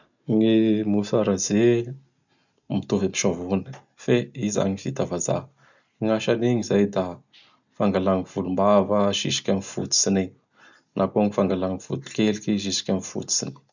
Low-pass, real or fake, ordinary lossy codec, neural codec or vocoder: 7.2 kHz; real; none; none